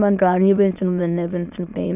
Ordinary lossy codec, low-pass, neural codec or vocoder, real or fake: none; 3.6 kHz; autoencoder, 22.05 kHz, a latent of 192 numbers a frame, VITS, trained on many speakers; fake